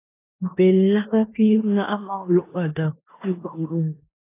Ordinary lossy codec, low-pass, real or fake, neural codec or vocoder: AAC, 24 kbps; 3.6 kHz; fake; codec, 16 kHz in and 24 kHz out, 0.9 kbps, LongCat-Audio-Codec, four codebook decoder